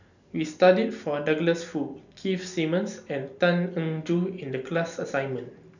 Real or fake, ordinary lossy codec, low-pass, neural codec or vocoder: real; none; 7.2 kHz; none